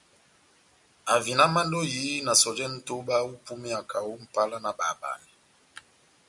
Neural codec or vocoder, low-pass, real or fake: none; 10.8 kHz; real